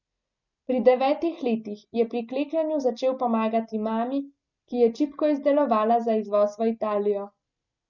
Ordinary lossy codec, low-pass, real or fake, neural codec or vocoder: none; 7.2 kHz; real; none